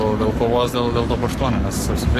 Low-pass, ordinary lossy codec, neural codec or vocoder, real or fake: 14.4 kHz; AAC, 64 kbps; codec, 44.1 kHz, 7.8 kbps, Pupu-Codec; fake